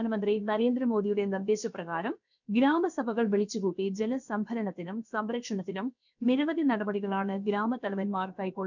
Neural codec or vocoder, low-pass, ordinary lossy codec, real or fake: codec, 16 kHz, about 1 kbps, DyCAST, with the encoder's durations; 7.2 kHz; none; fake